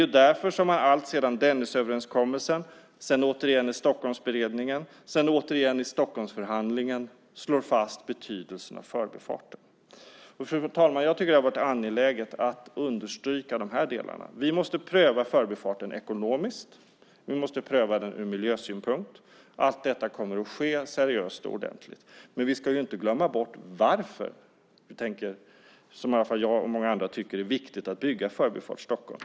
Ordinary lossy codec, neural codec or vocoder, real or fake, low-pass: none; none; real; none